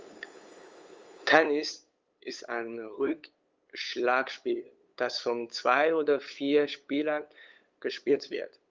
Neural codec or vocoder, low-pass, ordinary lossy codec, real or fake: codec, 16 kHz, 8 kbps, FunCodec, trained on LibriTTS, 25 frames a second; 7.2 kHz; Opus, 32 kbps; fake